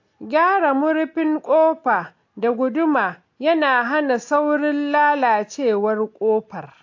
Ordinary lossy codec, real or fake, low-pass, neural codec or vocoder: AAC, 48 kbps; real; 7.2 kHz; none